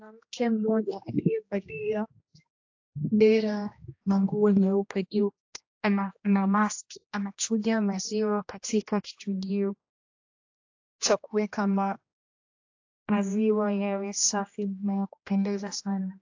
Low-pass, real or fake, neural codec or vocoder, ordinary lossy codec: 7.2 kHz; fake; codec, 16 kHz, 1 kbps, X-Codec, HuBERT features, trained on general audio; AAC, 48 kbps